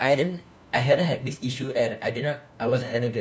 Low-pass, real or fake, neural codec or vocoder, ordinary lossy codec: none; fake; codec, 16 kHz, 1 kbps, FunCodec, trained on LibriTTS, 50 frames a second; none